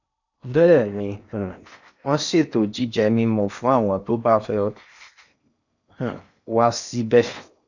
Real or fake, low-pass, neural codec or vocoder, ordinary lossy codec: fake; 7.2 kHz; codec, 16 kHz in and 24 kHz out, 0.8 kbps, FocalCodec, streaming, 65536 codes; none